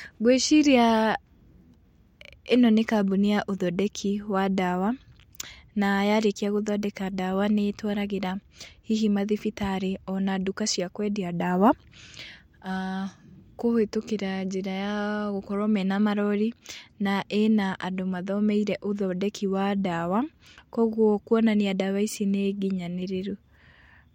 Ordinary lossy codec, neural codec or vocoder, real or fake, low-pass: MP3, 64 kbps; none; real; 19.8 kHz